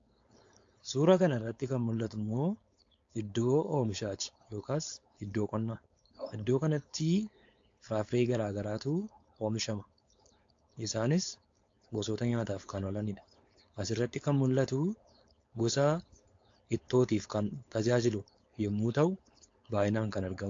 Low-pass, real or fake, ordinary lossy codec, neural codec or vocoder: 7.2 kHz; fake; AAC, 48 kbps; codec, 16 kHz, 4.8 kbps, FACodec